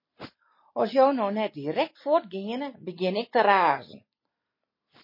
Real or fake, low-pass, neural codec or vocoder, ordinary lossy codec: real; 5.4 kHz; none; MP3, 24 kbps